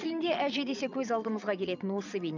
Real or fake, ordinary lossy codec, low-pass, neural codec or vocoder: fake; none; 7.2 kHz; vocoder, 22.05 kHz, 80 mel bands, Vocos